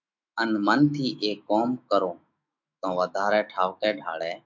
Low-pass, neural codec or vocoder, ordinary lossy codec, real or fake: 7.2 kHz; autoencoder, 48 kHz, 128 numbers a frame, DAC-VAE, trained on Japanese speech; MP3, 64 kbps; fake